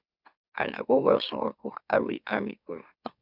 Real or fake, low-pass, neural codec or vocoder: fake; 5.4 kHz; autoencoder, 44.1 kHz, a latent of 192 numbers a frame, MeloTTS